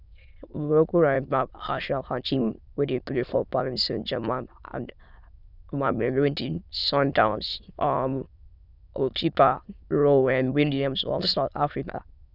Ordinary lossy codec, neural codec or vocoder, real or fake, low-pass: none; autoencoder, 22.05 kHz, a latent of 192 numbers a frame, VITS, trained on many speakers; fake; 5.4 kHz